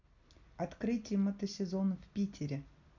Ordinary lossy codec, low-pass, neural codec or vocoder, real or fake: none; 7.2 kHz; none; real